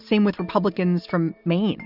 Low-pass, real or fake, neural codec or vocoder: 5.4 kHz; real; none